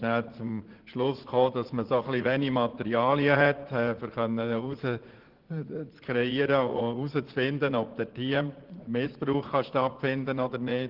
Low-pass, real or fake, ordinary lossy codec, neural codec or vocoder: 5.4 kHz; fake; Opus, 16 kbps; vocoder, 44.1 kHz, 80 mel bands, Vocos